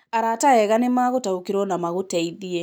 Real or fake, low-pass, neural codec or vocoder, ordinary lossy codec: real; none; none; none